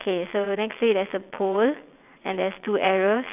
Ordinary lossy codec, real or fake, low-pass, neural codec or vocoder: none; fake; 3.6 kHz; vocoder, 22.05 kHz, 80 mel bands, WaveNeXt